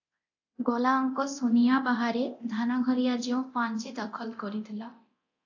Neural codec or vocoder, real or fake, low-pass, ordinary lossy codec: codec, 24 kHz, 0.9 kbps, DualCodec; fake; 7.2 kHz; AAC, 48 kbps